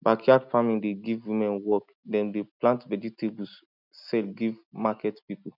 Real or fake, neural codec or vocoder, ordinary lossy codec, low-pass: real; none; none; 5.4 kHz